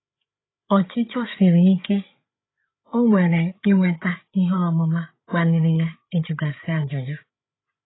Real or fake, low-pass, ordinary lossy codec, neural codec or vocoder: fake; 7.2 kHz; AAC, 16 kbps; codec, 16 kHz, 8 kbps, FreqCodec, larger model